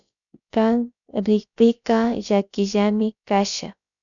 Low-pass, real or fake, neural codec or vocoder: 7.2 kHz; fake; codec, 16 kHz, about 1 kbps, DyCAST, with the encoder's durations